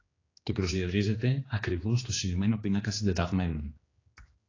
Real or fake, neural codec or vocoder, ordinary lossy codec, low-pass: fake; codec, 16 kHz, 2 kbps, X-Codec, HuBERT features, trained on general audio; AAC, 32 kbps; 7.2 kHz